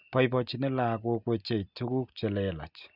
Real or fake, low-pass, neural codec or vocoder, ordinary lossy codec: real; 5.4 kHz; none; none